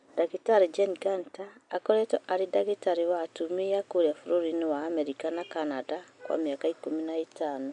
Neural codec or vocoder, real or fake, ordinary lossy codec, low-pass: none; real; MP3, 96 kbps; 9.9 kHz